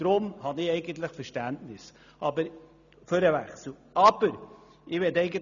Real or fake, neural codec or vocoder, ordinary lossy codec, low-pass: real; none; none; 7.2 kHz